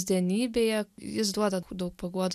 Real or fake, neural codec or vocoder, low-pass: real; none; 14.4 kHz